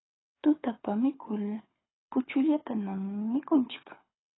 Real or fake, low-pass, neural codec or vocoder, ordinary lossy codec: fake; 7.2 kHz; codec, 24 kHz, 6 kbps, HILCodec; AAC, 16 kbps